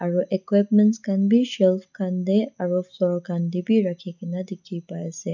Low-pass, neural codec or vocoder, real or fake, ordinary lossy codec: 7.2 kHz; none; real; none